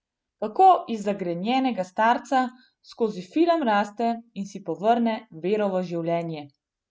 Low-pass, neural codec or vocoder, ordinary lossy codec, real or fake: none; none; none; real